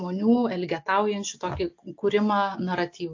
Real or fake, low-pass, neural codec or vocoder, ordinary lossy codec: real; 7.2 kHz; none; AAC, 48 kbps